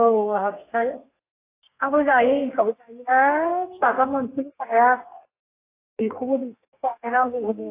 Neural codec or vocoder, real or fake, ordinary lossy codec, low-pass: codec, 32 kHz, 1.9 kbps, SNAC; fake; AAC, 24 kbps; 3.6 kHz